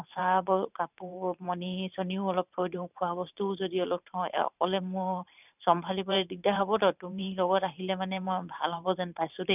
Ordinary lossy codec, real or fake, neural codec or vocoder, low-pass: none; fake; vocoder, 44.1 kHz, 128 mel bands every 512 samples, BigVGAN v2; 3.6 kHz